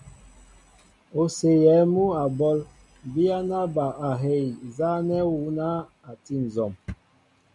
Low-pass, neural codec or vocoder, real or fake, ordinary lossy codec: 10.8 kHz; none; real; MP3, 96 kbps